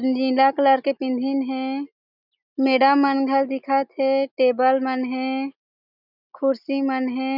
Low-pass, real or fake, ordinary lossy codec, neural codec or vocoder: 5.4 kHz; real; none; none